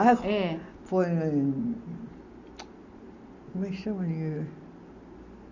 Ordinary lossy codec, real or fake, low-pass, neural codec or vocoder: none; real; 7.2 kHz; none